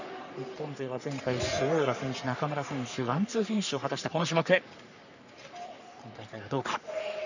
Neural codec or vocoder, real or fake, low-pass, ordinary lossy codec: codec, 44.1 kHz, 3.4 kbps, Pupu-Codec; fake; 7.2 kHz; none